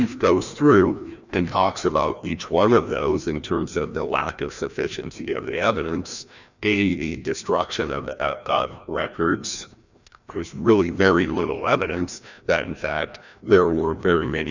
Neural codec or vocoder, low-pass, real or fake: codec, 16 kHz, 1 kbps, FreqCodec, larger model; 7.2 kHz; fake